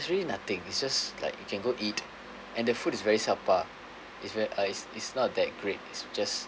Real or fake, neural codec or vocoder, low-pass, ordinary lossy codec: real; none; none; none